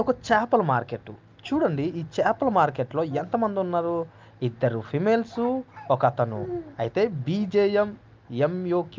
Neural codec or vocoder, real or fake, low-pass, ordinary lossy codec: none; real; 7.2 kHz; Opus, 32 kbps